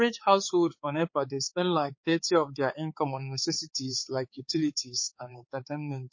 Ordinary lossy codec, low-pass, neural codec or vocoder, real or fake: MP3, 32 kbps; 7.2 kHz; codec, 16 kHz, 4 kbps, X-Codec, HuBERT features, trained on balanced general audio; fake